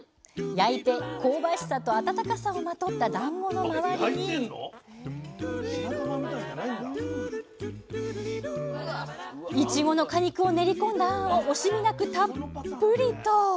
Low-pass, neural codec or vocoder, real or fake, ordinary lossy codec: none; none; real; none